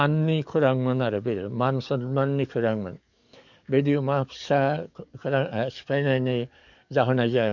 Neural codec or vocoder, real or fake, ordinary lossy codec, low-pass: codec, 44.1 kHz, 7.8 kbps, DAC; fake; none; 7.2 kHz